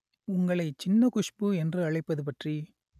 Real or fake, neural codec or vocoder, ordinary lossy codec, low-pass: real; none; none; 14.4 kHz